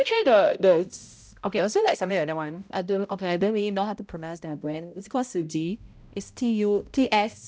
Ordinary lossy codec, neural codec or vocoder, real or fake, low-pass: none; codec, 16 kHz, 0.5 kbps, X-Codec, HuBERT features, trained on balanced general audio; fake; none